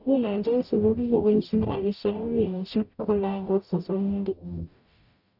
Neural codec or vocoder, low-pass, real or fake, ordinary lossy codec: codec, 44.1 kHz, 0.9 kbps, DAC; 5.4 kHz; fake; none